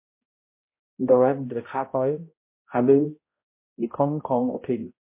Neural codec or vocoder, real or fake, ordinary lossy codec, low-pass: codec, 16 kHz, 0.5 kbps, X-Codec, HuBERT features, trained on balanced general audio; fake; MP3, 24 kbps; 3.6 kHz